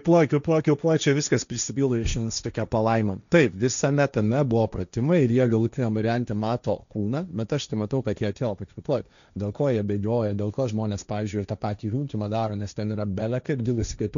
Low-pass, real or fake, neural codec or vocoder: 7.2 kHz; fake; codec, 16 kHz, 1.1 kbps, Voila-Tokenizer